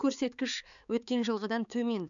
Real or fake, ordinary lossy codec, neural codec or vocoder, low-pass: fake; none; codec, 16 kHz, 4 kbps, X-Codec, HuBERT features, trained on balanced general audio; 7.2 kHz